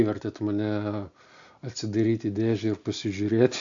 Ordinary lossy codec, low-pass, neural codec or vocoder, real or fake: AAC, 64 kbps; 7.2 kHz; none; real